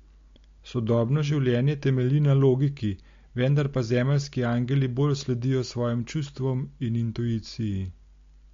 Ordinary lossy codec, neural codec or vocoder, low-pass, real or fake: MP3, 48 kbps; none; 7.2 kHz; real